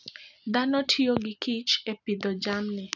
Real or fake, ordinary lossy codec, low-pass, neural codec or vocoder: real; none; 7.2 kHz; none